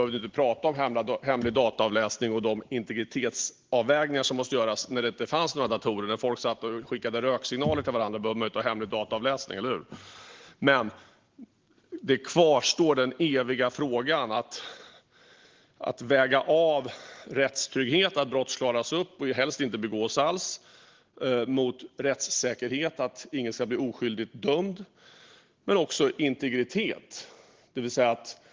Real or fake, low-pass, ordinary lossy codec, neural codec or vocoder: real; 7.2 kHz; Opus, 16 kbps; none